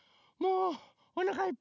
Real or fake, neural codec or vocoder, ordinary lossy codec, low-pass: real; none; none; 7.2 kHz